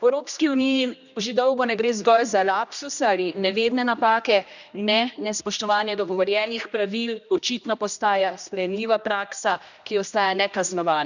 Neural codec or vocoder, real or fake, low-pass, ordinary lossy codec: codec, 16 kHz, 1 kbps, X-Codec, HuBERT features, trained on general audio; fake; 7.2 kHz; none